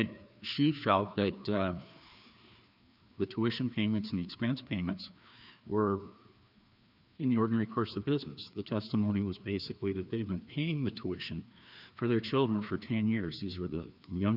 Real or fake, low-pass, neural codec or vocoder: fake; 5.4 kHz; codec, 16 kHz, 2 kbps, FreqCodec, larger model